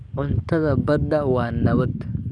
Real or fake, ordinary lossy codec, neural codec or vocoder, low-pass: fake; none; codec, 44.1 kHz, 7.8 kbps, DAC; 9.9 kHz